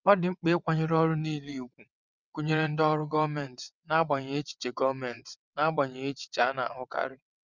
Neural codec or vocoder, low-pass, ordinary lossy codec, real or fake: vocoder, 22.05 kHz, 80 mel bands, WaveNeXt; 7.2 kHz; none; fake